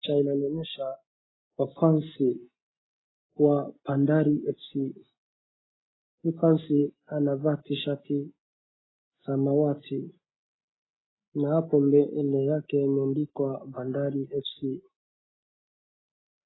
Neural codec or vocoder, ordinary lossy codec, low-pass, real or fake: none; AAC, 16 kbps; 7.2 kHz; real